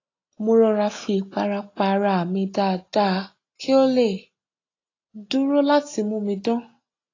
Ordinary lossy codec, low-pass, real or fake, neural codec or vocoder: AAC, 32 kbps; 7.2 kHz; real; none